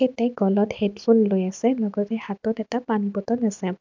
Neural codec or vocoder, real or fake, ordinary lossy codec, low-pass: none; real; MP3, 64 kbps; 7.2 kHz